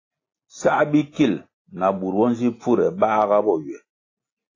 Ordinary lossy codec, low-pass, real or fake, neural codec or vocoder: AAC, 32 kbps; 7.2 kHz; real; none